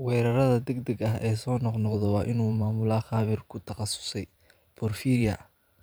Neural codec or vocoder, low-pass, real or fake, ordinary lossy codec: vocoder, 44.1 kHz, 128 mel bands every 512 samples, BigVGAN v2; none; fake; none